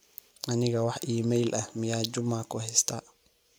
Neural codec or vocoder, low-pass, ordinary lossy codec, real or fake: none; none; none; real